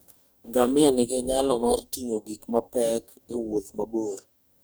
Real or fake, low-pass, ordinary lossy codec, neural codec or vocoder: fake; none; none; codec, 44.1 kHz, 2.6 kbps, DAC